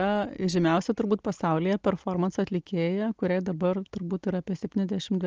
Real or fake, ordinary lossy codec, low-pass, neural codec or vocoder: real; Opus, 24 kbps; 7.2 kHz; none